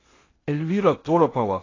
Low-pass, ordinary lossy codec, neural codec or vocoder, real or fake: 7.2 kHz; AAC, 32 kbps; codec, 16 kHz in and 24 kHz out, 0.8 kbps, FocalCodec, streaming, 65536 codes; fake